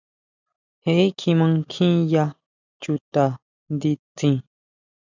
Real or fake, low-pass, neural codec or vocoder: real; 7.2 kHz; none